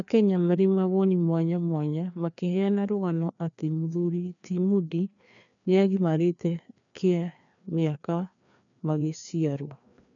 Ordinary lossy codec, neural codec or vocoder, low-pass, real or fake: none; codec, 16 kHz, 2 kbps, FreqCodec, larger model; 7.2 kHz; fake